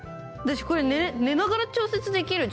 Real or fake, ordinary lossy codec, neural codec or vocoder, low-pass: real; none; none; none